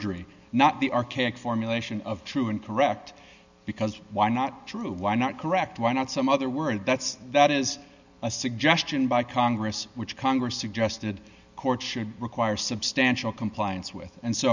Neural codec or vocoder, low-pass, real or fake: none; 7.2 kHz; real